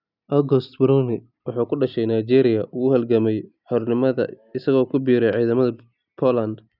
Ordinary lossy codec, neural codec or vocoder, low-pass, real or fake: none; none; 5.4 kHz; real